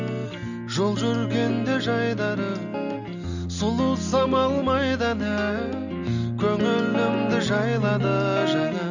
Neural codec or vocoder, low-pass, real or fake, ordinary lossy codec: none; 7.2 kHz; real; none